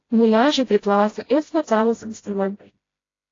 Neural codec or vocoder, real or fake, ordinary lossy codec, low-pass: codec, 16 kHz, 0.5 kbps, FreqCodec, smaller model; fake; AAC, 32 kbps; 7.2 kHz